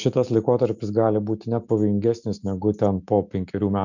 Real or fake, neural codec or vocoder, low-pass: real; none; 7.2 kHz